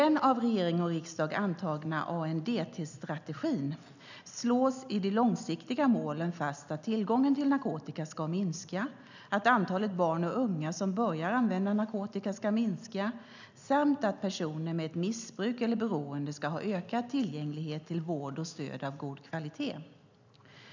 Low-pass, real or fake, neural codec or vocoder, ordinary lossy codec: 7.2 kHz; real; none; none